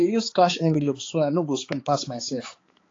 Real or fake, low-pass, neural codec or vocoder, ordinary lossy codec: fake; 7.2 kHz; codec, 16 kHz, 4 kbps, X-Codec, HuBERT features, trained on balanced general audio; AAC, 32 kbps